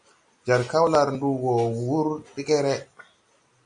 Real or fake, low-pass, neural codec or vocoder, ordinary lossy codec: real; 9.9 kHz; none; MP3, 48 kbps